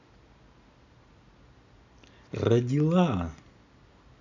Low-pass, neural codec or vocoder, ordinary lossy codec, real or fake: 7.2 kHz; none; none; real